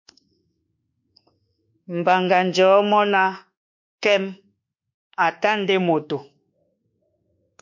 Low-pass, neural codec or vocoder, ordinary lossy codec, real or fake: 7.2 kHz; codec, 24 kHz, 1.2 kbps, DualCodec; MP3, 48 kbps; fake